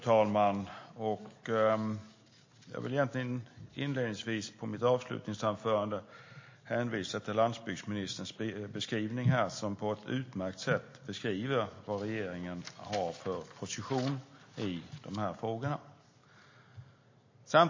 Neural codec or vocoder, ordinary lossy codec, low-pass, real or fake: none; MP3, 32 kbps; 7.2 kHz; real